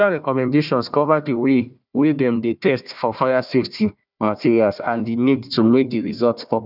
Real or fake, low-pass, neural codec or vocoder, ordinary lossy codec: fake; 5.4 kHz; codec, 16 kHz, 1 kbps, FunCodec, trained on Chinese and English, 50 frames a second; none